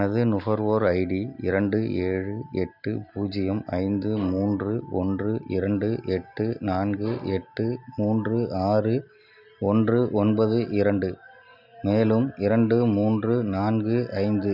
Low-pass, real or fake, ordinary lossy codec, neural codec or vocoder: 5.4 kHz; real; none; none